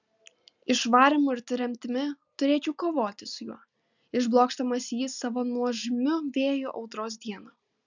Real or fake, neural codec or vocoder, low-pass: real; none; 7.2 kHz